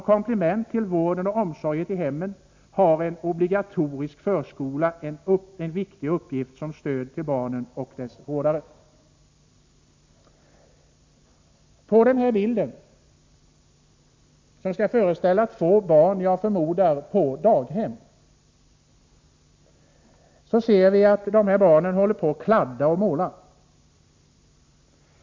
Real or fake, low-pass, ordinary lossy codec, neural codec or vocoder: real; 7.2 kHz; MP3, 48 kbps; none